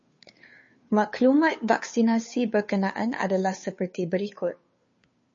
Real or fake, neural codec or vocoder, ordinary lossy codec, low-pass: fake; codec, 16 kHz, 2 kbps, FunCodec, trained on Chinese and English, 25 frames a second; MP3, 32 kbps; 7.2 kHz